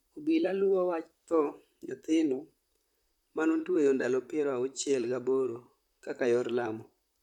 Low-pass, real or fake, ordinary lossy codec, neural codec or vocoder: 19.8 kHz; fake; none; vocoder, 44.1 kHz, 128 mel bands, Pupu-Vocoder